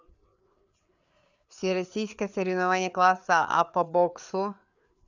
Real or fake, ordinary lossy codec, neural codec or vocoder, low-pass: fake; none; codec, 16 kHz, 4 kbps, FreqCodec, larger model; 7.2 kHz